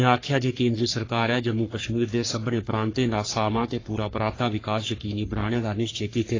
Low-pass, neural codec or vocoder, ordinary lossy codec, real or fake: 7.2 kHz; codec, 44.1 kHz, 3.4 kbps, Pupu-Codec; AAC, 32 kbps; fake